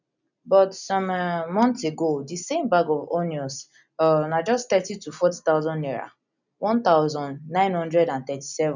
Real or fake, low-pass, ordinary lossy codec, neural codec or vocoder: real; 7.2 kHz; none; none